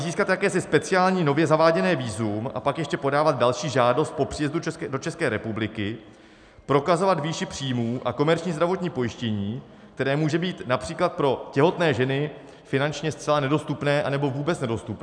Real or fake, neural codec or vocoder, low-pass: real; none; 9.9 kHz